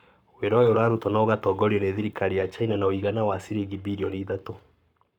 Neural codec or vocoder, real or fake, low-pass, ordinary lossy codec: codec, 44.1 kHz, 7.8 kbps, Pupu-Codec; fake; 19.8 kHz; none